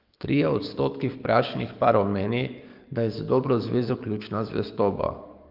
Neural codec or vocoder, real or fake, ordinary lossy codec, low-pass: codec, 44.1 kHz, 7.8 kbps, DAC; fake; Opus, 24 kbps; 5.4 kHz